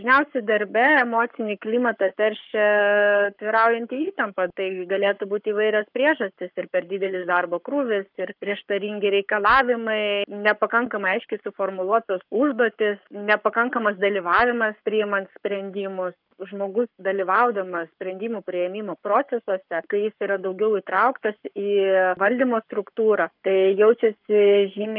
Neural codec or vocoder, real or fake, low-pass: codec, 44.1 kHz, 7.8 kbps, Pupu-Codec; fake; 5.4 kHz